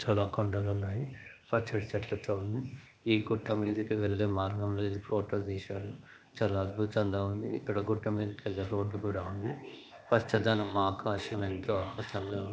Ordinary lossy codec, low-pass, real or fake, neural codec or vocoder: none; none; fake; codec, 16 kHz, 0.8 kbps, ZipCodec